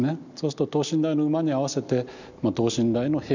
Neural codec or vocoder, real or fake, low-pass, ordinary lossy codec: none; real; 7.2 kHz; none